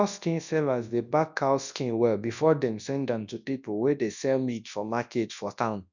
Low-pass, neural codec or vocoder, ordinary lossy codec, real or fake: 7.2 kHz; codec, 24 kHz, 0.9 kbps, WavTokenizer, large speech release; none; fake